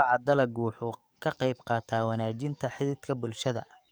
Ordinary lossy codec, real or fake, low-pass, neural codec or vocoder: none; fake; none; codec, 44.1 kHz, 7.8 kbps, DAC